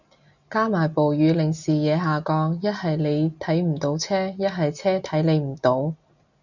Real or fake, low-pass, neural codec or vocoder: real; 7.2 kHz; none